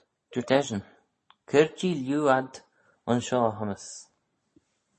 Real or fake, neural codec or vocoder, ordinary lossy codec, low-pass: real; none; MP3, 32 kbps; 10.8 kHz